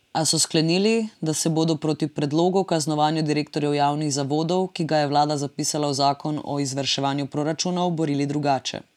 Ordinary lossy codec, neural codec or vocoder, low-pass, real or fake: none; none; 19.8 kHz; real